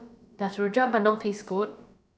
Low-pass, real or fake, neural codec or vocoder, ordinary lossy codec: none; fake; codec, 16 kHz, about 1 kbps, DyCAST, with the encoder's durations; none